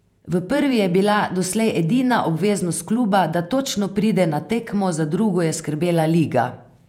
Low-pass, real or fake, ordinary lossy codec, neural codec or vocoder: 19.8 kHz; fake; none; vocoder, 48 kHz, 128 mel bands, Vocos